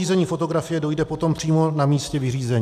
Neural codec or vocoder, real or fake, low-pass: none; real; 14.4 kHz